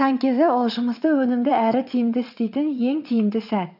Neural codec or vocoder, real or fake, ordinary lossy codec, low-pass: vocoder, 22.05 kHz, 80 mel bands, WaveNeXt; fake; none; 5.4 kHz